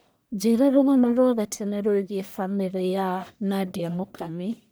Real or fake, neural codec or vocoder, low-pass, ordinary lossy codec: fake; codec, 44.1 kHz, 1.7 kbps, Pupu-Codec; none; none